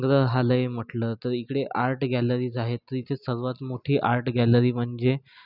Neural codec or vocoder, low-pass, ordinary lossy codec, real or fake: none; 5.4 kHz; none; real